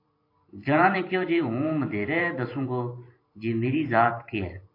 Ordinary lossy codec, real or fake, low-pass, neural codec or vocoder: AAC, 32 kbps; fake; 5.4 kHz; vocoder, 44.1 kHz, 128 mel bands every 512 samples, BigVGAN v2